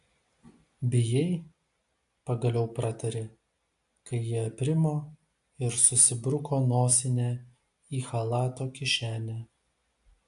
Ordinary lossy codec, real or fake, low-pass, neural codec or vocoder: AAC, 64 kbps; real; 10.8 kHz; none